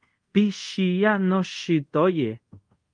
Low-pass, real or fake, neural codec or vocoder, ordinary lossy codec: 9.9 kHz; fake; codec, 24 kHz, 0.5 kbps, DualCodec; Opus, 32 kbps